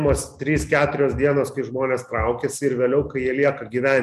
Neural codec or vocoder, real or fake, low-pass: none; real; 14.4 kHz